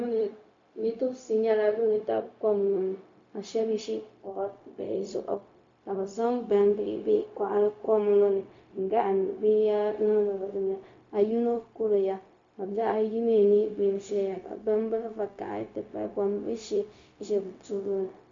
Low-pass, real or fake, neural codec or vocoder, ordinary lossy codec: 7.2 kHz; fake; codec, 16 kHz, 0.4 kbps, LongCat-Audio-Codec; MP3, 48 kbps